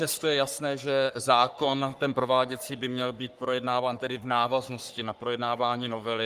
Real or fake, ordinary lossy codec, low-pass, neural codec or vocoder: fake; Opus, 32 kbps; 14.4 kHz; codec, 44.1 kHz, 3.4 kbps, Pupu-Codec